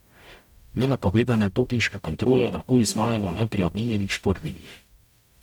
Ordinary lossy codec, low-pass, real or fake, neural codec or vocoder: none; 19.8 kHz; fake; codec, 44.1 kHz, 0.9 kbps, DAC